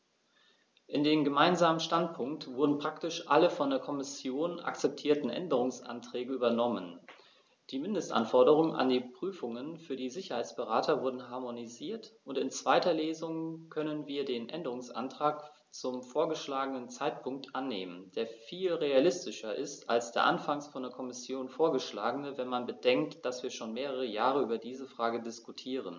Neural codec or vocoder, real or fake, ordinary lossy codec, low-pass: none; real; none; none